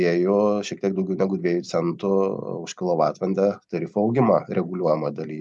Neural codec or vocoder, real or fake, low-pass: none; real; 10.8 kHz